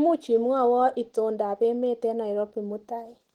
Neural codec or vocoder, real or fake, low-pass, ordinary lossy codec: none; real; 19.8 kHz; Opus, 16 kbps